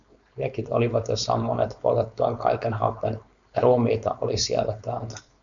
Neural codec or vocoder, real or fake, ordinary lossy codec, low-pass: codec, 16 kHz, 4.8 kbps, FACodec; fake; MP3, 96 kbps; 7.2 kHz